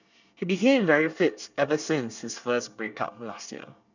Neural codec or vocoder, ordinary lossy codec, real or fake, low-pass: codec, 24 kHz, 1 kbps, SNAC; none; fake; 7.2 kHz